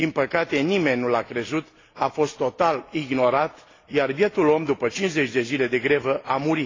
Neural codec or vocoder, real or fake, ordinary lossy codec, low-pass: none; real; AAC, 32 kbps; 7.2 kHz